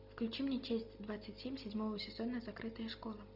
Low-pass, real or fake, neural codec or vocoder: 5.4 kHz; real; none